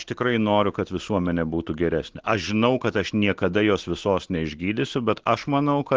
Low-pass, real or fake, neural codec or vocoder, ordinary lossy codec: 7.2 kHz; real; none; Opus, 16 kbps